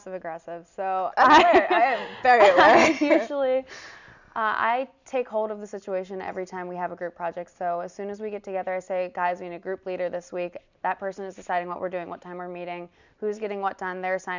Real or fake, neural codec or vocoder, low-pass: real; none; 7.2 kHz